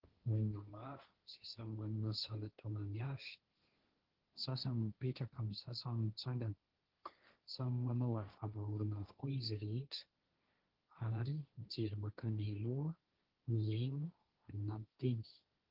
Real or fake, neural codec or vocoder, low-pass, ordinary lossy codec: fake; codec, 16 kHz, 1.1 kbps, Voila-Tokenizer; 5.4 kHz; Opus, 16 kbps